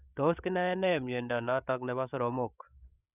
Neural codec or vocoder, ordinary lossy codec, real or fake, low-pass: codec, 16 kHz, 8 kbps, FreqCodec, larger model; none; fake; 3.6 kHz